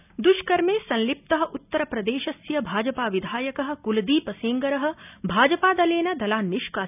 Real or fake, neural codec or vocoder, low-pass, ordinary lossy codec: real; none; 3.6 kHz; none